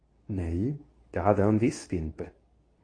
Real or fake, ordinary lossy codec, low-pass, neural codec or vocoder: fake; AAC, 32 kbps; 9.9 kHz; codec, 24 kHz, 0.9 kbps, WavTokenizer, medium speech release version 2